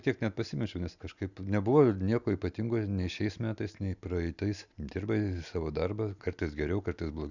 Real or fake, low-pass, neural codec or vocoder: real; 7.2 kHz; none